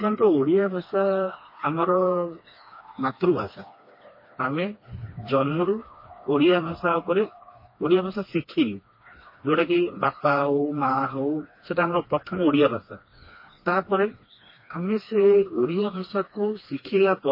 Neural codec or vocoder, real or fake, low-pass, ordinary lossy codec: codec, 16 kHz, 2 kbps, FreqCodec, smaller model; fake; 5.4 kHz; MP3, 24 kbps